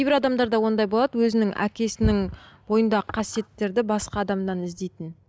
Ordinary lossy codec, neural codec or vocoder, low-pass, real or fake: none; none; none; real